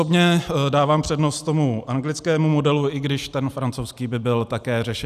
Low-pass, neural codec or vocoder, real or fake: 14.4 kHz; none; real